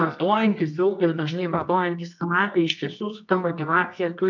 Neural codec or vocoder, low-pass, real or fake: codec, 24 kHz, 0.9 kbps, WavTokenizer, medium music audio release; 7.2 kHz; fake